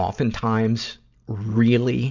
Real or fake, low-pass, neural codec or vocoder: real; 7.2 kHz; none